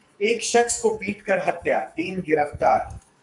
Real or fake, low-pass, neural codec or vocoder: fake; 10.8 kHz; codec, 44.1 kHz, 2.6 kbps, SNAC